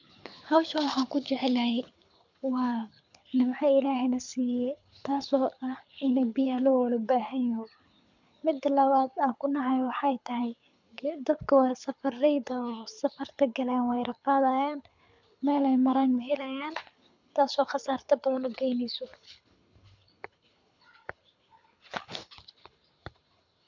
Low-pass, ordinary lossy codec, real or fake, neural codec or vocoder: 7.2 kHz; MP3, 64 kbps; fake; codec, 24 kHz, 6 kbps, HILCodec